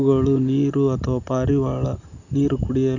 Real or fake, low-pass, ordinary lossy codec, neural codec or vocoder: real; 7.2 kHz; none; none